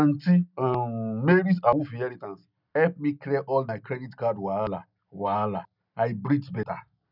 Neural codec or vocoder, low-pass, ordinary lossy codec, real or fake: none; 5.4 kHz; none; real